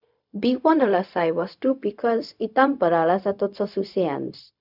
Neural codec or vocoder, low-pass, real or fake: codec, 16 kHz, 0.4 kbps, LongCat-Audio-Codec; 5.4 kHz; fake